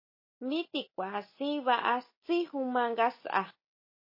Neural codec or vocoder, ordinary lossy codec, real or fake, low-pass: none; MP3, 24 kbps; real; 5.4 kHz